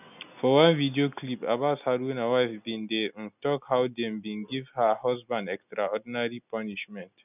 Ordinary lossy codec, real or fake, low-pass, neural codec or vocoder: none; real; 3.6 kHz; none